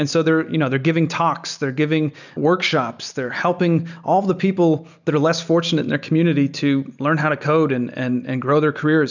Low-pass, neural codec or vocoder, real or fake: 7.2 kHz; none; real